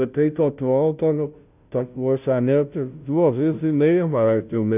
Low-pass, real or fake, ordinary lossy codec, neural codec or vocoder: 3.6 kHz; fake; none; codec, 16 kHz, 0.5 kbps, FunCodec, trained on Chinese and English, 25 frames a second